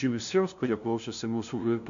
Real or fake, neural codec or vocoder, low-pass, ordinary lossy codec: fake; codec, 16 kHz, 0.5 kbps, FunCodec, trained on LibriTTS, 25 frames a second; 7.2 kHz; MP3, 64 kbps